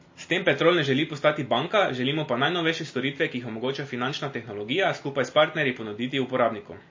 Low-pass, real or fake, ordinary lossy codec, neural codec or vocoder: 7.2 kHz; real; MP3, 32 kbps; none